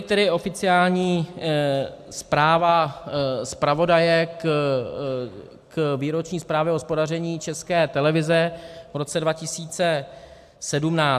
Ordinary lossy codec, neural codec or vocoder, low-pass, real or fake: AAC, 96 kbps; none; 14.4 kHz; real